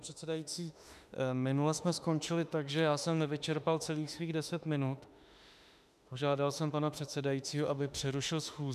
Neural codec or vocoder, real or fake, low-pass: autoencoder, 48 kHz, 32 numbers a frame, DAC-VAE, trained on Japanese speech; fake; 14.4 kHz